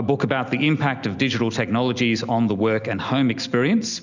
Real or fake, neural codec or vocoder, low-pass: real; none; 7.2 kHz